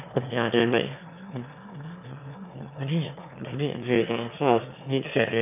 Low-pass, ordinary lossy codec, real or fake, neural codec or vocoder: 3.6 kHz; none; fake; autoencoder, 22.05 kHz, a latent of 192 numbers a frame, VITS, trained on one speaker